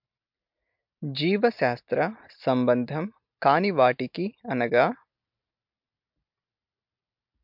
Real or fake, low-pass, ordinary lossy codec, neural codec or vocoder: real; 5.4 kHz; MP3, 48 kbps; none